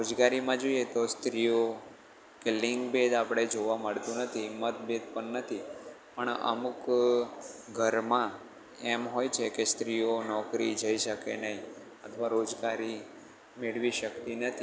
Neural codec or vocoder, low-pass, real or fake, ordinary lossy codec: none; none; real; none